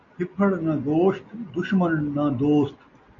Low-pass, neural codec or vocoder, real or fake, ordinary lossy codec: 7.2 kHz; none; real; MP3, 96 kbps